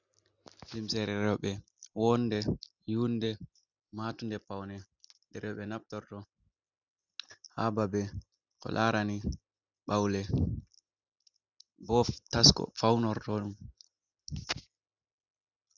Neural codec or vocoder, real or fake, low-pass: none; real; 7.2 kHz